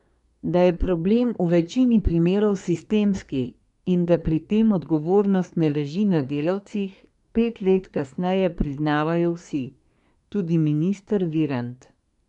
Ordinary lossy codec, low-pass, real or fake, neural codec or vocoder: none; 10.8 kHz; fake; codec, 24 kHz, 1 kbps, SNAC